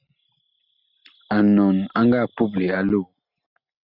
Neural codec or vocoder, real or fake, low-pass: none; real; 5.4 kHz